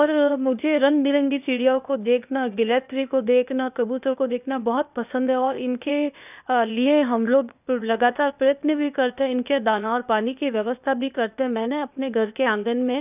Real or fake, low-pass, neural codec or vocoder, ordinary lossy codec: fake; 3.6 kHz; codec, 16 kHz, 0.8 kbps, ZipCodec; none